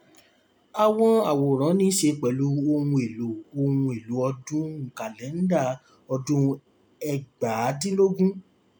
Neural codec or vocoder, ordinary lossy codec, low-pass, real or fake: none; none; none; real